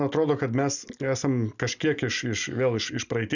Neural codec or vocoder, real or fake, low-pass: none; real; 7.2 kHz